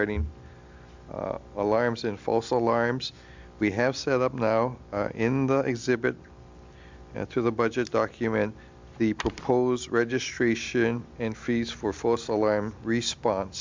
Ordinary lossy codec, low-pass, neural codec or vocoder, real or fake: MP3, 64 kbps; 7.2 kHz; none; real